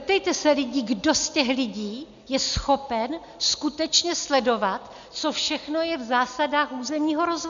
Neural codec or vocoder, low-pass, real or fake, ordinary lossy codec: none; 7.2 kHz; real; MP3, 96 kbps